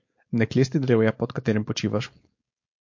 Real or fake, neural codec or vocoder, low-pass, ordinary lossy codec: fake; codec, 16 kHz, 4.8 kbps, FACodec; 7.2 kHz; MP3, 48 kbps